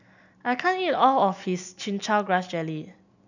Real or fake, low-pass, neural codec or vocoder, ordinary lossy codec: real; 7.2 kHz; none; none